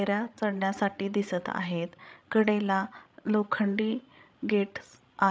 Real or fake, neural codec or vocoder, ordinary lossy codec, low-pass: fake; codec, 16 kHz, 16 kbps, FreqCodec, larger model; none; none